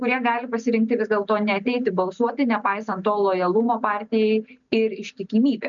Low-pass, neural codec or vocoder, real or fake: 7.2 kHz; none; real